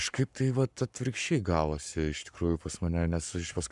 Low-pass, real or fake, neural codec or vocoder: 10.8 kHz; fake; codec, 44.1 kHz, 7.8 kbps, Pupu-Codec